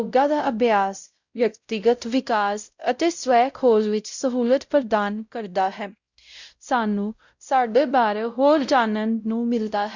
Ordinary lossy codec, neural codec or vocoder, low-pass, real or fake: Opus, 64 kbps; codec, 16 kHz, 0.5 kbps, X-Codec, WavLM features, trained on Multilingual LibriSpeech; 7.2 kHz; fake